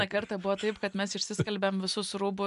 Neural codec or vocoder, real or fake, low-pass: none; real; 10.8 kHz